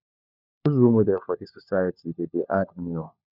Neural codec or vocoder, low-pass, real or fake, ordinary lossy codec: codec, 16 kHz, 4 kbps, FunCodec, trained on LibriTTS, 50 frames a second; 5.4 kHz; fake; none